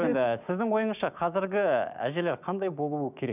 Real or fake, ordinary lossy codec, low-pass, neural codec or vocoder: fake; none; 3.6 kHz; codec, 16 kHz, 6 kbps, DAC